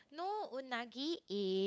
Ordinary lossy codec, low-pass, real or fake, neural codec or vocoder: none; none; fake; codec, 16 kHz, 8 kbps, FunCodec, trained on LibriTTS, 25 frames a second